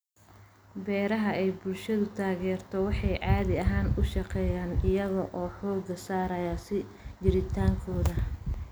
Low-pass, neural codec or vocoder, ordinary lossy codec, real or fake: none; none; none; real